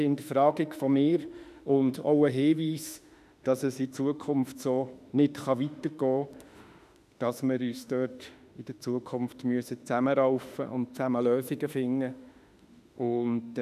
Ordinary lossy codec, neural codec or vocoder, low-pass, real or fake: none; autoencoder, 48 kHz, 32 numbers a frame, DAC-VAE, trained on Japanese speech; 14.4 kHz; fake